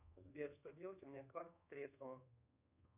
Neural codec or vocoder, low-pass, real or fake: codec, 24 kHz, 1.2 kbps, DualCodec; 3.6 kHz; fake